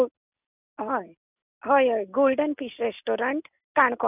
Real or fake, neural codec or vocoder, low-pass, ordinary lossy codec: real; none; 3.6 kHz; none